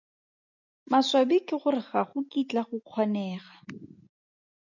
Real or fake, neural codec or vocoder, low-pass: real; none; 7.2 kHz